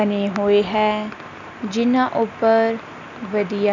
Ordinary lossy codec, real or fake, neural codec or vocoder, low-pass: none; real; none; 7.2 kHz